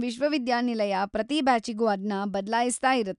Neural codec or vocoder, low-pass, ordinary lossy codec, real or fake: none; 10.8 kHz; none; real